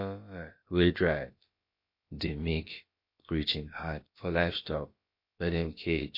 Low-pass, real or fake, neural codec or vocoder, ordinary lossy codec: 5.4 kHz; fake; codec, 16 kHz, about 1 kbps, DyCAST, with the encoder's durations; MP3, 32 kbps